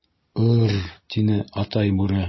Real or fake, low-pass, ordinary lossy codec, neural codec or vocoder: real; 7.2 kHz; MP3, 24 kbps; none